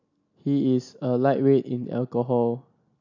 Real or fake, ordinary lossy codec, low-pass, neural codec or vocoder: real; AAC, 48 kbps; 7.2 kHz; none